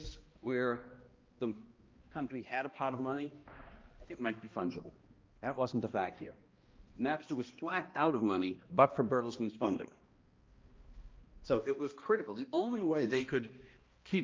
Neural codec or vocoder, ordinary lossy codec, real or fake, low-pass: codec, 16 kHz, 1 kbps, X-Codec, HuBERT features, trained on balanced general audio; Opus, 32 kbps; fake; 7.2 kHz